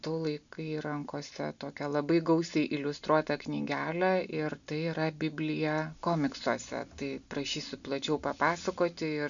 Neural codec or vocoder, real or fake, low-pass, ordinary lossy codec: none; real; 7.2 kHz; MP3, 96 kbps